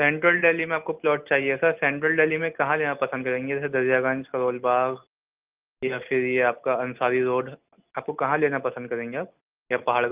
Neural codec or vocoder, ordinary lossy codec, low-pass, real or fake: none; Opus, 24 kbps; 3.6 kHz; real